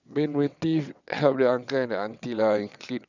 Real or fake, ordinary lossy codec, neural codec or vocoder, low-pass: fake; none; vocoder, 22.05 kHz, 80 mel bands, WaveNeXt; 7.2 kHz